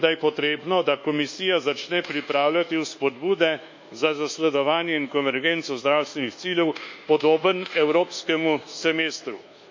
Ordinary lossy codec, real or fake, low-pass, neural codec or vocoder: MP3, 64 kbps; fake; 7.2 kHz; codec, 24 kHz, 1.2 kbps, DualCodec